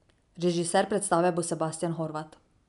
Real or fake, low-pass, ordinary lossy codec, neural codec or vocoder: real; 10.8 kHz; MP3, 96 kbps; none